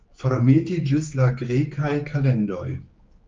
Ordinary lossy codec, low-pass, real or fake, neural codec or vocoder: Opus, 24 kbps; 7.2 kHz; fake; codec, 16 kHz, 4 kbps, X-Codec, HuBERT features, trained on general audio